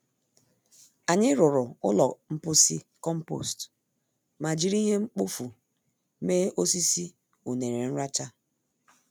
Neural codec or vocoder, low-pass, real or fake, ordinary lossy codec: none; none; real; none